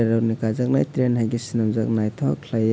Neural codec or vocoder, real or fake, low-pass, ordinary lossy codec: none; real; none; none